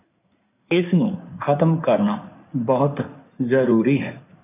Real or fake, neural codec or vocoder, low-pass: fake; codec, 16 kHz in and 24 kHz out, 2.2 kbps, FireRedTTS-2 codec; 3.6 kHz